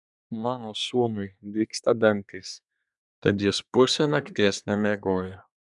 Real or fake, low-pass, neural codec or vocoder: fake; 10.8 kHz; codec, 24 kHz, 1 kbps, SNAC